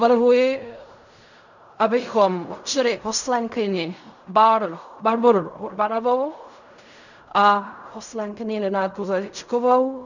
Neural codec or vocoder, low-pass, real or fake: codec, 16 kHz in and 24 kHz out, 0.4 kbps, LongCat-Audio-Codec, fine tuned four codebook decoder; 7.2 kHz; fake